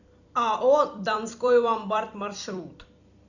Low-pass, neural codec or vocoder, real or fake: 7.2 kHz; vocoder, 44.1 kHz, 128 mel bands every 256 samples, BigVGAN v2; fake